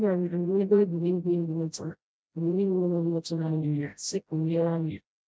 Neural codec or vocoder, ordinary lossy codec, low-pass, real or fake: codec, 16 kHz, 0.5 kbps, FreqCodec, smaller model; none; none; fake